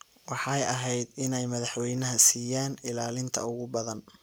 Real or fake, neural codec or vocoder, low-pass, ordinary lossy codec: real; none; none; none